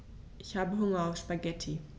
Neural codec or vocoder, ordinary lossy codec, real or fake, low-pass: none; none; real; none